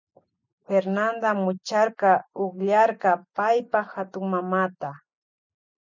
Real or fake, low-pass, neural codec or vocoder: real; 7.2 kHz; none